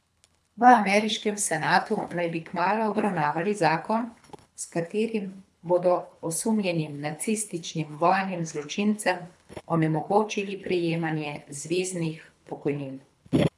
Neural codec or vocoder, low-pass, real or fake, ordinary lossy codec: codec, 24 kHz, 3 kbps, HILCodec; none; fake; none